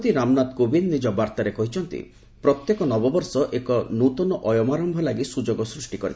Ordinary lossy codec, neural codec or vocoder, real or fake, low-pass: none; none; real; none